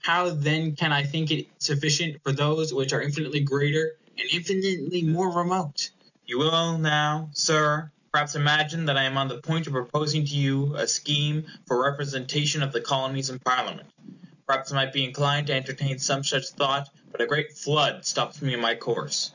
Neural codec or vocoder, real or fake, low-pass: none; real; 7.2 kHz